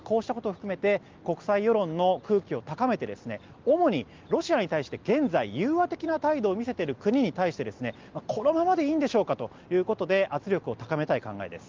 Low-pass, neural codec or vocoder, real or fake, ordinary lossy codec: 7.2 kHz; none; real; Opus, 32 kbps